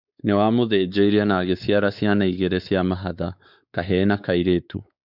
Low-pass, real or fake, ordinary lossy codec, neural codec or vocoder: 5.4 kHz; fake; none; codec, 16 kHz, 4 kbps, X-Codec, WavLM features, trained on Multilingual LibriSpeech